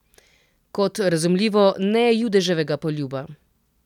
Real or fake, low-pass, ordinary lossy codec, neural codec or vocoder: real; 19.8 kHz; none; none